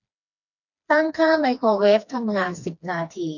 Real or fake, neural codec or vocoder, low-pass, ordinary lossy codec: fake; codec, 16 kHz, 2 kbps, FreqCodec, smaller model; 7.2 kHz; none